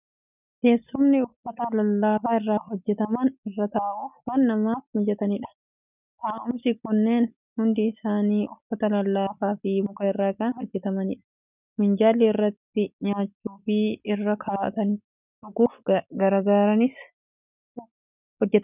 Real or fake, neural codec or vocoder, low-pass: real; none; 3.6 kHz